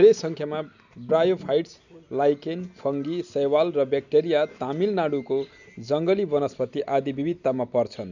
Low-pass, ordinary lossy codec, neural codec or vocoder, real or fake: 7.2 kHz; none; none; real